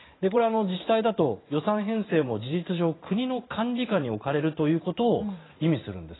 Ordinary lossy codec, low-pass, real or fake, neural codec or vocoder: AAC, 16 kbps; 7.2 kHz; fake; vocoder, 44.1 kHz, 128 mel bands every 512 samples, BigVGAN v2